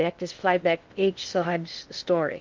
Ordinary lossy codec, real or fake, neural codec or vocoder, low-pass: Opus, 24 kbps; fake; codec, 16 kHz in and 24 kHz out, 0.6 kbps, FocalCodec, streaming, 2048 codes; 7.2 kHz